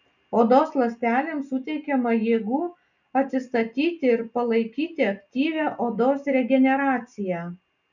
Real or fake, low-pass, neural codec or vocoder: real; 7.2 kHz; none